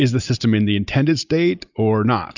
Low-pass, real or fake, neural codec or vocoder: 7.2 kHz; real; none